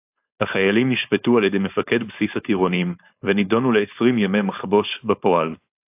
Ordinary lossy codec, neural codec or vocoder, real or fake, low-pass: AAC, 32 kbps; codec, 16 kHz, 4.8 kbps, FACodec; fake; 3.6 kHz